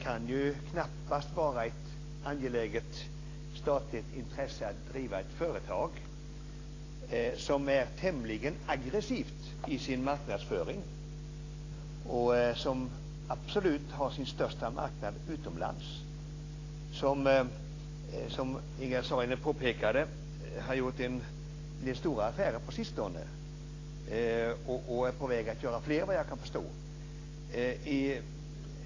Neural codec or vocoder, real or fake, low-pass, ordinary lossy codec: none; real; 7.2 kHz; AAC, 32 kbps